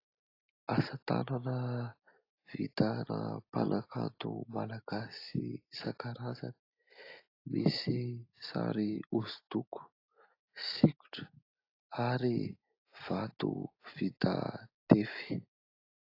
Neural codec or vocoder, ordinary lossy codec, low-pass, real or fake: none; AAC, 32 kbps; 5.4 kHz; real